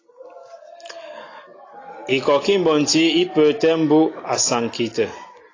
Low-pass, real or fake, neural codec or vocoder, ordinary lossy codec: 7.2 kHz; real; none; AAC, 32 kbps